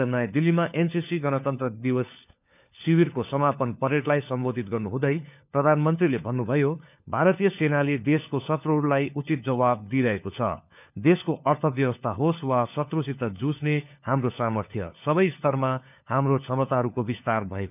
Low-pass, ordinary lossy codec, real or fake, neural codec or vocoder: 3.6 kHz; none; fake; codec, 16 kHz, 4 kbps, FunCodec, trained on LibriTTS, 50 frames a second